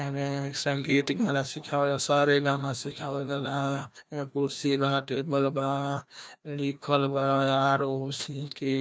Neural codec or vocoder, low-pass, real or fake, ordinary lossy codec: codec, 16 kHz, 1 kbps, FreqCodec, larger model; none; fake; none